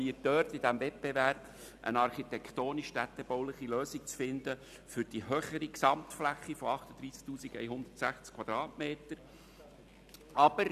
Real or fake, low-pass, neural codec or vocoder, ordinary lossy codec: real; 14.4 kHz; none; none